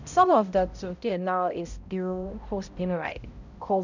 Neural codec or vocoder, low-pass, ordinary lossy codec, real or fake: codec, 16 kHz, 0.5 kbps, X-Codec, HuBERT features, trained on balanced general audio; 7.2 kHz; none; fake